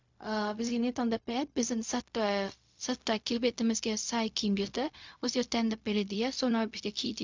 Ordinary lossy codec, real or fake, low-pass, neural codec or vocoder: MP3, 64 kbps; fake; 7.2 kHz; codec, 16 kHz, 0.4 kbps, LongCat-Audio-Codec